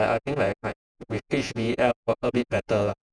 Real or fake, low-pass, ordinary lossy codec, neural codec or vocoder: fake; 9.9 kHz; none; vocoder, 48 kHz, 128 mel bands, Vocos